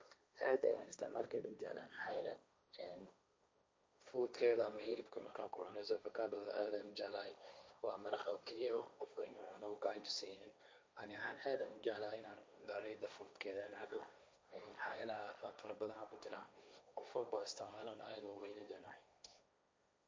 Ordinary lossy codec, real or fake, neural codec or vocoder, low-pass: none; fake; codec, 16 kHz, 1.1 kbps, Voila-Tokenizer; none